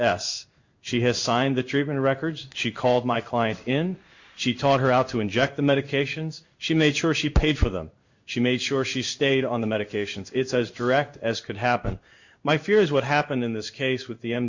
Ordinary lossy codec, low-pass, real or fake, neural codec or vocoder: Opus, 64 kbps; 7.2 kHz; fake; codec, 16 kHz in and 24 kHz out, 1 kbps, XY-Tokenizer